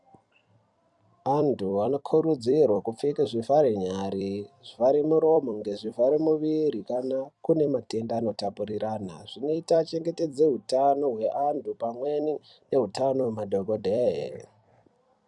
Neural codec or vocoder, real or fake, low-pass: vocoder, 44.1 kHz, 128 mel bands every 256 samples, BigVGAN v2; fake; 10.8 kHz